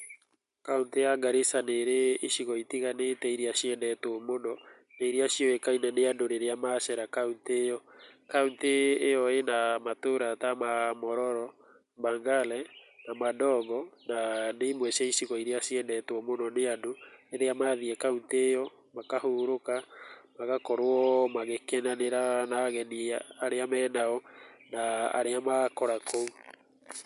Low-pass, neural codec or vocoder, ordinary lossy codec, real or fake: 10.8 kHz; none; MP3, 64 kbps; real